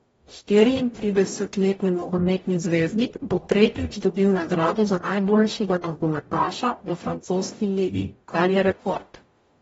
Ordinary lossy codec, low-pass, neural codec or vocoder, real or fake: AAC, 24 kbps; 19.8 kHz; codec, 44.1 kHz, 0.9 kbps, DAC; fake